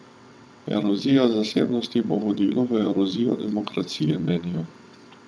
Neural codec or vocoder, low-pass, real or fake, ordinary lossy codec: vocoder, 22.05 kHz, 80 mel bands, WaveNeXt; none; fake; none